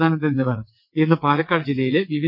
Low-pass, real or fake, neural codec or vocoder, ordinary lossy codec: 5.4 kHz; fake; vocoder, 22.05 kHz, 80 mel bands, WaveNeXt; none